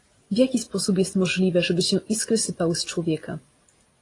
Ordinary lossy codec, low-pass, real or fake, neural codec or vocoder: AAC, 32 kbps; 10.8 kHz; real; none